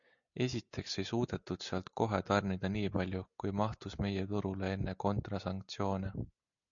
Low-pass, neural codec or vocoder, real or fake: 7.2 kHz; none; real